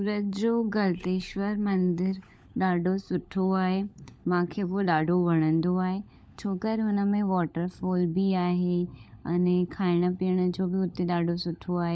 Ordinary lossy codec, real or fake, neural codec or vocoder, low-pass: none; fake; codec, 16 kHz, 16 kbps, FunCodec, trained on LibriTTS, 50 frames a second; none